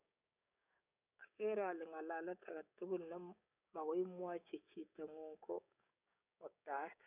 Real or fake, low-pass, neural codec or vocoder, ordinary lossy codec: fake; 3.6 kHz; codec, 16 kHz, 6 kbps, DAC; none